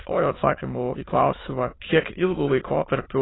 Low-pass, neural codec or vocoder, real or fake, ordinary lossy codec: 7.2 kHz; autoencoder, 22.05 kHz, a latent of 192 numbers a frame, VITS, trained on many speakers; fake; AAC, 16 kbps